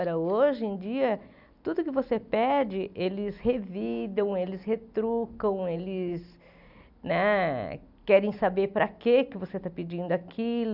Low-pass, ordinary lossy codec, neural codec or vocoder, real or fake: 5.4 kHz; none; none; real